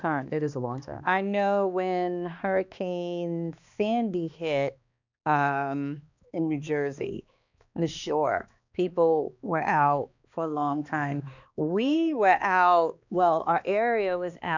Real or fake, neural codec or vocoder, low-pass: fake; codec, 16 kHz, 2 kbps, X-Codec, HuBERT features, trained on balanced general audio; 7.2 kHz